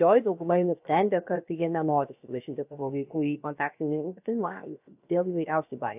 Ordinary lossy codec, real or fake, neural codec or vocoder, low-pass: AAC, 32 kbps; fake; codec, 16 kHz, 0.7 kbps, FocalCodec; 3.6 kHz